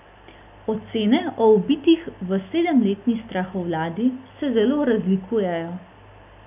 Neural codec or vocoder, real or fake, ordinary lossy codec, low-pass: none; real; none; 3.6 kHz